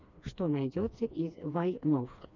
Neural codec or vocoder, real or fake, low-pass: codec, 16 kHz, 2 kbps, FreqCodec, smaller model; fake; 7.2 kHz